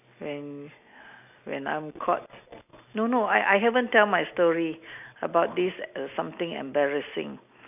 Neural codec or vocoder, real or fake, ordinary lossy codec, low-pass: none; real; none; 3.6 kHz